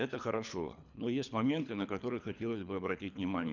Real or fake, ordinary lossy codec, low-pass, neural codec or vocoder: fake; none; 7.2 kHz; codec, 24 kHz, 3 kbps, HILCodec